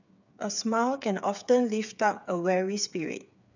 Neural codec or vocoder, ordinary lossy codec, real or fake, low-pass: codec, 16 kHz, 8 kbps, FreqCodec, smaller model; none; fake; 7.2 kHz